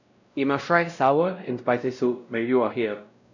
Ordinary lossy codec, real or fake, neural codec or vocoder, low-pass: none; fake; codec, 16 kHz, 0.5 kbps, X-Codec, WavLM features, trained on Multilingual LibriSpeech; 7.2 kHz